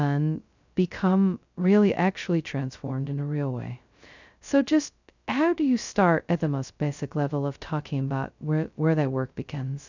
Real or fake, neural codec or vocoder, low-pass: fake; codec, 16 kHz, 0.2 kbps, FocalCodec; 7.2 kHz